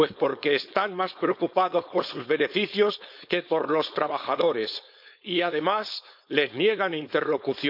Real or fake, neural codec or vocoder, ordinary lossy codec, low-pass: fake; codec, 16 kHz, 4.8 kbps, FACodec; none; 5.4 kHz